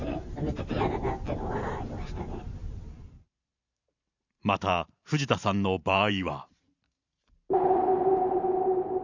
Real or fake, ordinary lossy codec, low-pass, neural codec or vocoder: fake; Opus, 64 kbps; 7.2 kHz; vocoder, 44.1 kHz, 128 mel bands every 512 samples, BigVGAN v2